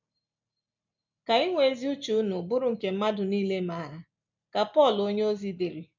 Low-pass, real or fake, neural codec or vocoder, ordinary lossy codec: 7.2 kHz; real; none; MP3, 64 kbps